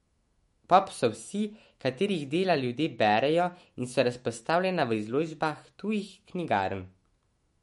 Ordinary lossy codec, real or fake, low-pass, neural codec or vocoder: MP3, 48 kbps; fake; 19.8 kHz; autoencoder, 48 kHz, 128 numbers a frame, DAC-VAE, trained on Japanese speech